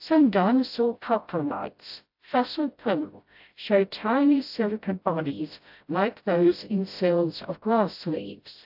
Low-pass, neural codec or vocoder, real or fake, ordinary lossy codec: 5.4 kHz; codec, 16 kHz, 0.5 kbps, FreqCodec, smaller model; fake; AAC, 48 kbps